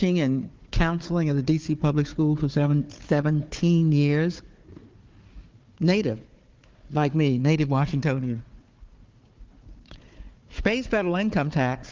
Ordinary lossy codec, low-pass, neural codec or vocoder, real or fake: Opus, 32 kbps; 7.2 kHz; codec, 16 kHz, 4 kbps, FunCodec, trained on Chinese and English, 50 frames a second; fake